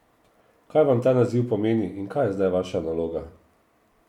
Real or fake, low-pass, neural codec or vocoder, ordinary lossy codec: real; 19.8 kHz; none; MP3, 96 kbps